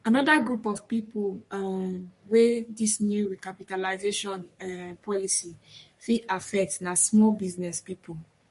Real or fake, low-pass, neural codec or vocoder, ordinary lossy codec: fake; 14.4 kHz; codec, 44.1 kHz, 3.4 kbps, Pupu-Codec; MP3, 48 kbps